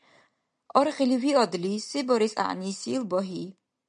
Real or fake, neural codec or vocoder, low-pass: real; none; 9.9 kHz